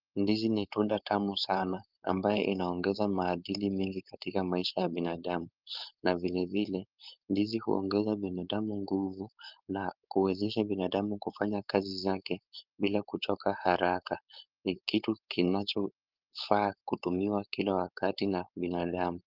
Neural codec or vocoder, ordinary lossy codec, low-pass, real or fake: codec, 16 kHz, 4.8 kbps, FACodec; Opus, 24 kbps; 5.4 kHz; fake